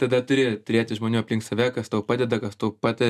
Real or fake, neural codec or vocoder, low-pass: real; none; 14.4 kHz